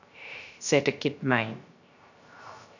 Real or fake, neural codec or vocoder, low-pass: fake; codec, 16 kHz, 0.3 kbps, FocalCodec; 7.2 kHz